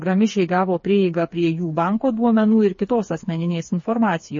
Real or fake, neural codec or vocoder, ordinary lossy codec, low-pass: fake; codec, 16 kHz, 4 kbps, FreqCodec, smaller model; MP3, 32 kbps; 7.2 kHz